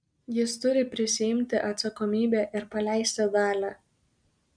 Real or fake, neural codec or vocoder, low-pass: real; none; 9.9 kHz